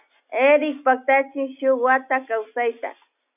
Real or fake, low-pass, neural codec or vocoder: real; 3.6 kHz; none